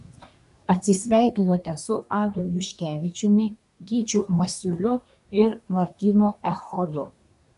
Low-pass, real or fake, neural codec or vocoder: 10.8 kHz; fake; codec, 24 kHz, 1 kbps, SNAC